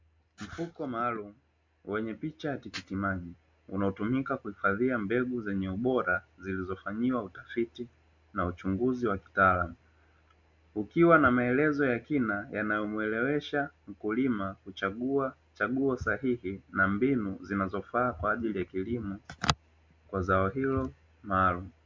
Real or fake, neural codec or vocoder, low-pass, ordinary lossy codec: real; none; 7.2 kHz; MP3, 64 kbps